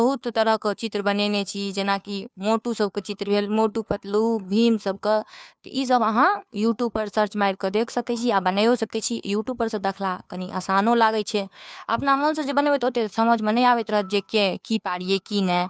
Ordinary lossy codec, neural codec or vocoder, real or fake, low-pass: none; codec, 16 kHz, 2 kbps, FunCodec, trained on Chinese and English, 25 frames a second; fake; none